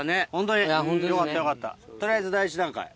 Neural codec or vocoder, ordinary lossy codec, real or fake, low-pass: none; none; real; none